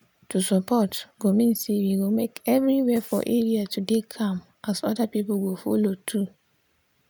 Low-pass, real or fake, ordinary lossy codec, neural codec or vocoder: none; real; none; none